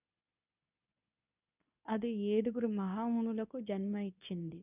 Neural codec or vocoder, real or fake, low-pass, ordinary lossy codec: codec, 24 kHz, 0.9 kbps, WavTokenizer, medium speech release version 2; fake; 3.6 kHz; none